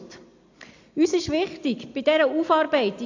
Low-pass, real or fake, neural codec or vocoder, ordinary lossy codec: 7.2 kHz; real; none; AAC, 48 kbps